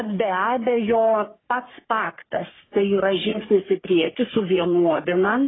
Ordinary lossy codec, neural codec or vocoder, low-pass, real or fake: AAC, 16 kbps; codec, 44.1 kHz, 3.4 kbps, Pupu-Codec; 7.2 kHz; fake